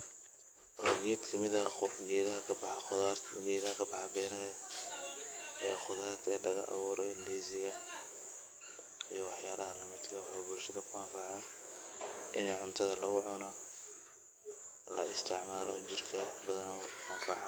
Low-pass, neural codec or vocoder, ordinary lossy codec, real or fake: none; codec, 44.1 kHz, 7.8 kbps, DAC; none; fake